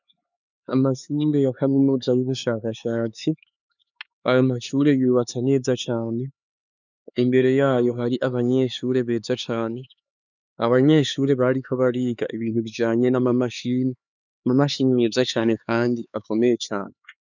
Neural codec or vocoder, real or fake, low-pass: codec, 16 kHz, 4 kbps, X-Codec, HuBERT features, trained on LibriSpeech; fake; 7.2 kHz